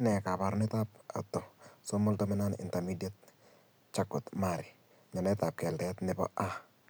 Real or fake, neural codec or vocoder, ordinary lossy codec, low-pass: real; none; none; none